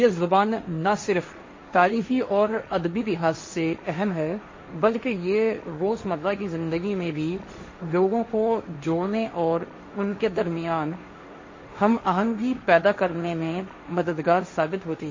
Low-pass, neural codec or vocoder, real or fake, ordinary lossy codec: 7.2 kHz; codec, 16 kHz, 1.1 kbps, Voila-Tokenizer; fake; MP3, 32 kbps